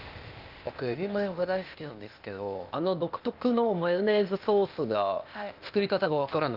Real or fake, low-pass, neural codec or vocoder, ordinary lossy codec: fake; 5.4 kHz; codec, 16 kHz, 0.8 kbps, ZipCodec; Opus, 32 kbps